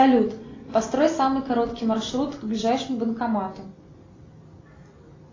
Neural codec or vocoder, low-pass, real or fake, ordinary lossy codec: none; 7.2 kHz; real; AAC, 32 kbps